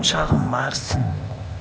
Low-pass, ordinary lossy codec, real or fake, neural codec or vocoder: none; none; fake; codec, 16 kHz, 0.8 kbps, ZipCodec